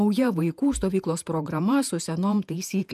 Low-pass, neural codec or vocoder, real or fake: 14.4 kHz; vocoder, 48 kHz, 128 mel bands, Vocos; fake